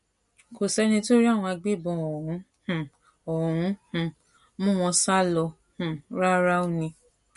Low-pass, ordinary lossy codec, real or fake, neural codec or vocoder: 14.4 kHz; MP3, 48 kbps; real; none